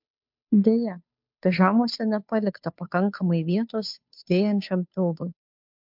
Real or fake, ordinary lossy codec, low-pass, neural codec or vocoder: fake; MP3, 48 kbps; 5.4 kHz; codec, 16 kHz, 2 kbps, FunCodec, trained on Chinese and English, 25 frames a second